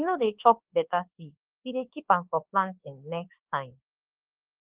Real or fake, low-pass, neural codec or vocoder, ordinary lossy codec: fake; 3.6 kHz; codec, 24 kHz, 1.2 kbps, DualCodec; Opus, 16 kbps